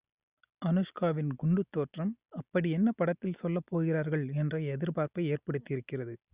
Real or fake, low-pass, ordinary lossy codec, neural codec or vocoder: real; 3.6 kHz; Opus, 64 kbps; none